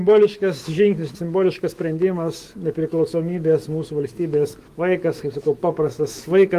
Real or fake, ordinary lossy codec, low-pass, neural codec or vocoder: fake; Opus, 24 kbps; 14.4 kHz; autoencoder, 48 kHz, 128 numbers a frame, DAC-VAE, trained on Japanese speech